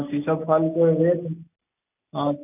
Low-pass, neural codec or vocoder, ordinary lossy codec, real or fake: 3.6 kHz; none; none; real